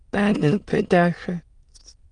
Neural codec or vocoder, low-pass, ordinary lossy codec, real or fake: autoencoder, 22.05 kHz, a latent of 192 numbers a frame, VITS, trained on many speakers; 9.9 kHz; Opus, 32 kbps; fake